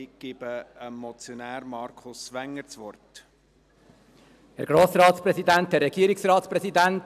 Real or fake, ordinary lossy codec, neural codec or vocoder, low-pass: real; none; none; 14.4 kHz